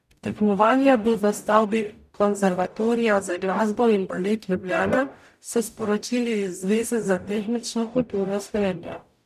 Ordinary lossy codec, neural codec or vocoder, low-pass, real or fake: none; codec, 44.1 kHz, 0.9 kbps, DAC; 14.4 kHz; fake